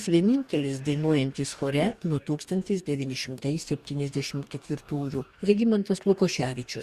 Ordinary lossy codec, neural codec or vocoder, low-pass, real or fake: Opus, 64 kbps; codec, 44.1 kHz, 2.6 kbps, DAC; 14.4 kHz; fake